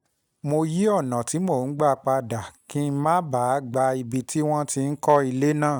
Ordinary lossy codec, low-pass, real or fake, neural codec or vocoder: none; none; real; none